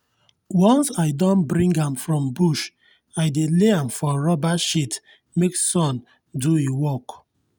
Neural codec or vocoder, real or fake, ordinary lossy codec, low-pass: none; real; none; none